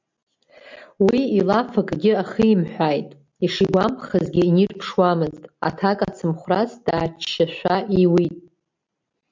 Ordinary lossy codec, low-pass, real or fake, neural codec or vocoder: MP3, 64 kbps; 7.2 kHz; real; none